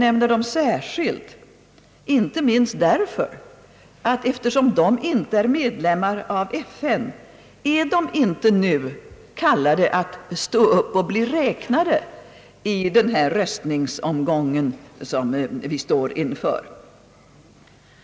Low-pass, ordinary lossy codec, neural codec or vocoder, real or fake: none; none; none; real